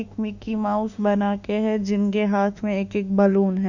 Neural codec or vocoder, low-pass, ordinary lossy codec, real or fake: autoencoder, 48 kHz, 32 numbers a frame, DAC-VAE, trained on Japanese speech; 7.2 kHz; none; fake